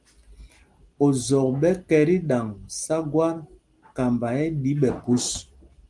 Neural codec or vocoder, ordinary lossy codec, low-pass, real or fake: none; Opus, 24 kbps; 10.8 kHz; real